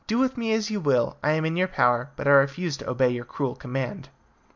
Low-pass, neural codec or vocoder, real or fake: 7.2 kHz; none; real